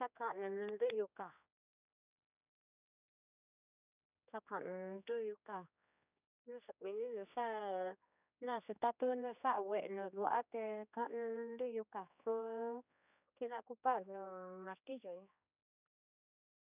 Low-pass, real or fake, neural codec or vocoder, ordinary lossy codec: 3.6 kHz; fake; codec, 16 kHz, 2 kbps, X-Codec, HuBERT features, trained on general audio; none